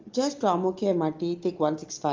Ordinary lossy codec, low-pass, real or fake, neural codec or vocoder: Opus, 32 kbps; 7.2 kHz; real; none